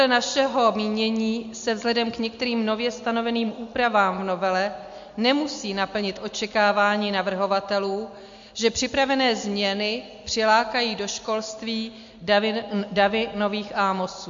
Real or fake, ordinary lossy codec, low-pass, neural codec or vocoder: real; MP3, 48 kbps; 7.2 kHz; none